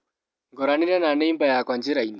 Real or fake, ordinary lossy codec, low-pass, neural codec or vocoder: real; none; none; none